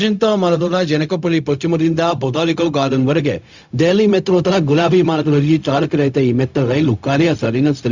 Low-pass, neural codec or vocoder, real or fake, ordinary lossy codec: 7.2 kHz; codec, 16 kHz, 0.4 kbps, LongCat-Audio-Codec; fake; Opus, 64 kbps